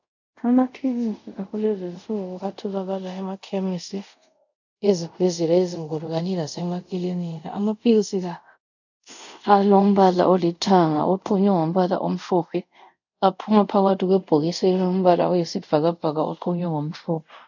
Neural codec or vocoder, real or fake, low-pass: codec, 24 kHz, 0.5 kbps, DualCodec; fake; 7.2 kHz